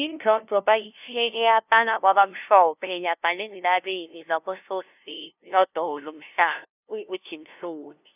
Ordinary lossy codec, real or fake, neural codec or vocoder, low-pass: none; fake; codec, 16 kHz, 0.5 kbps, FunCodec, trained on LibriTTS, 25 frames a second; 3.6 kHz